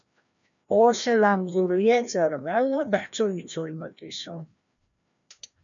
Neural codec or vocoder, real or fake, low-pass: codec, 16 kHz, 1 kbps, FreqCodec, larger model; fake; 7.2 kHz